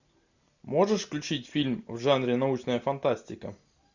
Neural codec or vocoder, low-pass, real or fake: none; 7.2 kHz; real